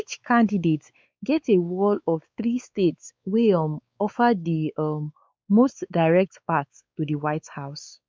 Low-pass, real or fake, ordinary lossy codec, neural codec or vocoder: none; fake; none; codec, 16 kHz, 4 kbps, X-Codec, WavLM features, trained on Multilingual LibriSpeech